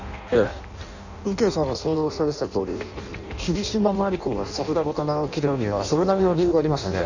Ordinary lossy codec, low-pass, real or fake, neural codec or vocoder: none; 7.2 kHz; fake; codec, 16 kHz in and 24 kHz out, 0.6 kbps, FireRedTTS-2 codec